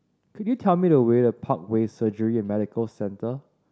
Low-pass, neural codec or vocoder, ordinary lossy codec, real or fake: none; none; none; real